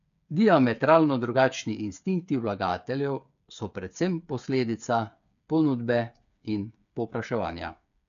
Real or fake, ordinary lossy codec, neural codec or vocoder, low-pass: fake; none; codec, 16 kHz, 8 kbps, FreqCodec, smaller model; 7.2 kHz